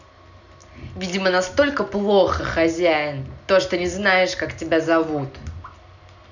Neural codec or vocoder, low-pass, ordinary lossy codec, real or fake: none; 7.2 kHz; none; real